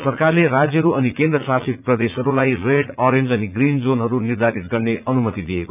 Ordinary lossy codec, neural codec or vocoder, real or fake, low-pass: none; vocoder, 44.1 kHz, 80 mel bands, Vocos; fake; 3.6 kHz